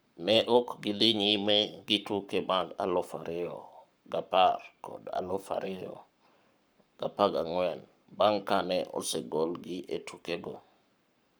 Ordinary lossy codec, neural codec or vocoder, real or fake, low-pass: none; codec, 44.1 kHz, 7.8 kbps, Pupu-Codec; fake; none